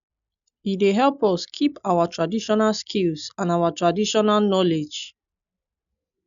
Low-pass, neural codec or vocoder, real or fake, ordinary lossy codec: 7.2 kHz; none; real; none